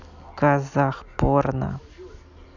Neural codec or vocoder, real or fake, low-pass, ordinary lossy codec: none; real; 7.2 kHz; none